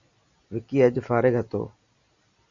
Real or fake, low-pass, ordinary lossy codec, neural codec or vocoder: real; 7.2 kHz; Opus, 64 kbps; none